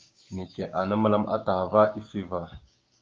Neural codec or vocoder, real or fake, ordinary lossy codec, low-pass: codec, 16 kHz, 6 kbps, DAC; fake; Opus, 24 kbps; 7.2 kHz